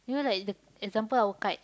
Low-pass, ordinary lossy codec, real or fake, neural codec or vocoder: none; none; real; none